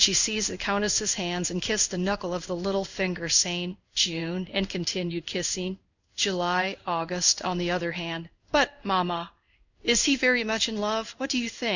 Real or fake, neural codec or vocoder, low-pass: fake; codec, 16 kHz in and 24 kHz out, 1 kbps, XY-Tokenizer; 7.2 kHz